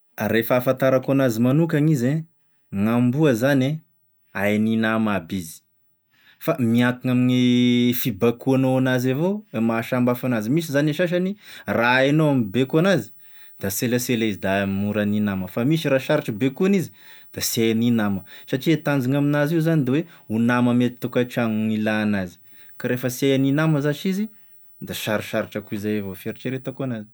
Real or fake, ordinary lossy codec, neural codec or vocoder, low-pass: real; none; none; none